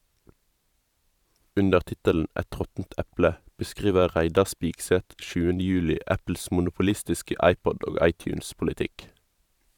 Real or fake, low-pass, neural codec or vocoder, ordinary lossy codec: fake; 19.8 kHz; vocoder, 44.1 kHz, 128 mel bands every 512 samples, BigVGAN v2; none